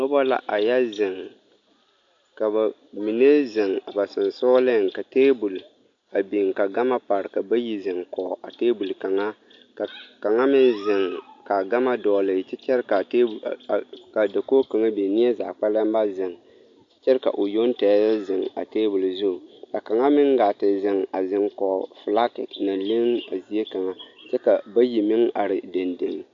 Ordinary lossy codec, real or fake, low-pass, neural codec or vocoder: AAC, 48 kbps; real; 7.2 kHz; none